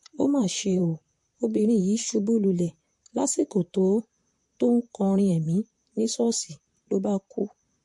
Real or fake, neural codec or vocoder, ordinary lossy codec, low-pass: fake; vocoder, 44.1 kHz, 128 mel bands, Pupu-Vocoder; MP3, 48 kbps; 10.8 kHz